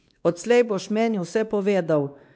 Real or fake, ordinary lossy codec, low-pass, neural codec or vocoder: fake; none; none; codec, 16 kHz, 2 kbps, X-Codec, WavLM features, trained on Multilingual LibriSpeech